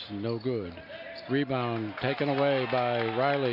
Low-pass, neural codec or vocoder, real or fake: 5.4 kHz; none; real